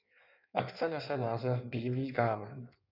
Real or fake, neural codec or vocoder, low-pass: fake; codec, 16 kHz in and 24 kHz out, 1.1 kbps, FireRedTTS-2 codec; 5.4 kHz